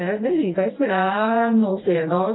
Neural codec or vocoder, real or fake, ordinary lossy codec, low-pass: codec, 16 kHz, 1 kbps, FreqCodec, smaller model; fake; AAC, 16 kbps; 7.2 kHz